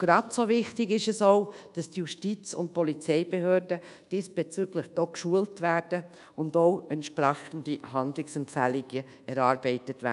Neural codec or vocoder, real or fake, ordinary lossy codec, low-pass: codec, 24 kHz, 1.2 kbps, DualCodec; fake; none; 10.8 kHz